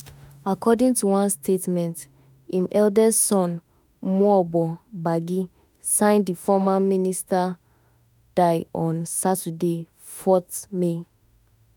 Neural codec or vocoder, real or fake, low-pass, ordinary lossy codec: autoencoder, 48 kHz, 32 numbers a frame, DAC-VAE, trained on Japanese speech; fake; none; none